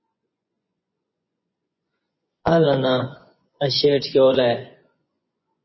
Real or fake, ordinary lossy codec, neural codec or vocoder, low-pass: fake; MP3, 24 kbps; vocoder, 24 kHz, 100 mel bands, Vocos; 7.2 kHz